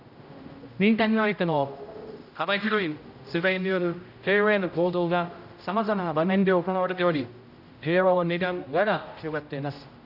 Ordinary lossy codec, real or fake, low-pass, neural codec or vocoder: none; fake; 5.4 kHz; codec, 16 kHz, 0.5 kbps, X-Codec, HuBERT features, trained on general audio